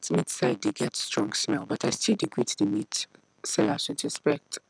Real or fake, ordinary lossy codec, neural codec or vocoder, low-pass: real; none; none; 9.9 kHz